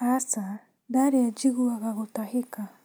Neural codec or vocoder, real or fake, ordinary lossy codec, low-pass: none; real; none; none